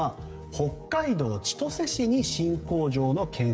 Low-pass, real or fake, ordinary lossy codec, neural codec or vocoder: none; fake; none; codec, 16 kHz, 16 kbps, FreqCodec, smaller model